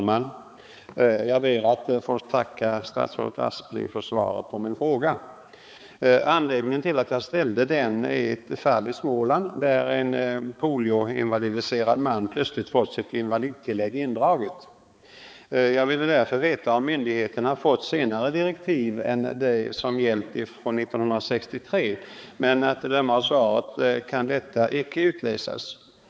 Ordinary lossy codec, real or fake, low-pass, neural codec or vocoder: none; fake; none; codec, 16 kHz, 4 kbps, X-Codec, HuBERT features, trained on balanced general audio